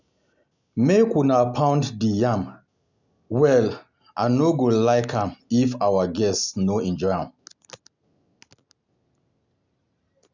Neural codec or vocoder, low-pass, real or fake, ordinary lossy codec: none; 7.2 kHz; real; none